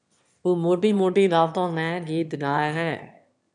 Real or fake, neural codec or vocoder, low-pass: fake; autoencoder, 22.05 kHz, a latent of 192 numbers a frame, VITS, trained on one speaker; 9.9 kHz